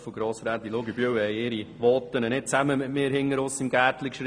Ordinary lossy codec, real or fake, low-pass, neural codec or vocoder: none; real; 9.9 kHz; none